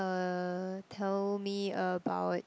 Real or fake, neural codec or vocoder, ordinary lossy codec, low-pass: real; none; none; none